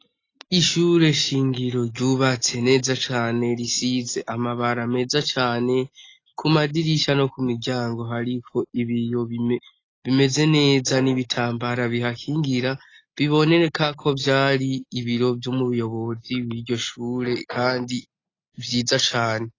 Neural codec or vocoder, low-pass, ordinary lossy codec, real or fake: none; 7.2 kHz; AAC, 32 kbps; real